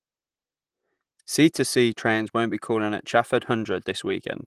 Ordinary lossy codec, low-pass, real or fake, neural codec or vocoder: Opus, 24 kbps; 14.4 kHz; fake; vocoder, 44.1 kHz, 128 mel bands, Pupu-Vocoder